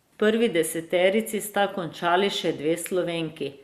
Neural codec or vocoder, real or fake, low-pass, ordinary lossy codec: none; real; 14.4 kHz; Opus, 64 kbps